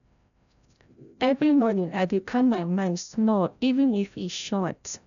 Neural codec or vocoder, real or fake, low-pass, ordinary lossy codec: codec, 16 kHz, 0.5 kbps, FreqCodec, larger model; fake; 7.2 kHz; none